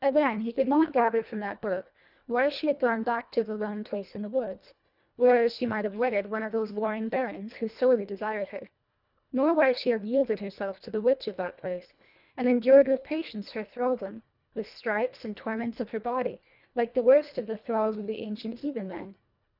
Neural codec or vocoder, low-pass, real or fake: codec, 24 kHz, 1.5 kbps, HILCodec; 5.4 kHz; fake